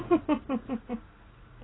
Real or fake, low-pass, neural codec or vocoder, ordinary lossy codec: real; 7.2 kHz; none; AAC, 16 kbps